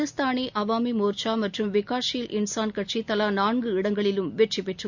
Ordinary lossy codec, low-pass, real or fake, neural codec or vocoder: none; 7.2 kHz; real; none